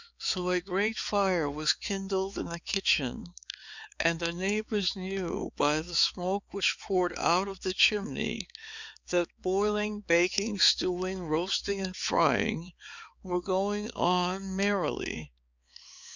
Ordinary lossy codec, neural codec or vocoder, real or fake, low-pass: Opus, 64 kbps; codec, 16 kHz, 4 kbps, X-Codec, HuBERT features, trained on balanced general audio; fake; 7.2 kHz